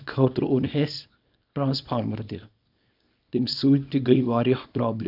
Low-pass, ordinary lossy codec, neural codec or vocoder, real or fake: 5.4 kHz; none; codec, 24 kHz, 0.9 kbps, WavTokenizer, small release; fake